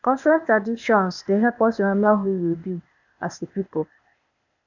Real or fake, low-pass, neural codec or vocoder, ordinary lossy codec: fake; 7.2 kHz; codec, 16 kHz, 0.8 kbps, ZipCodec; none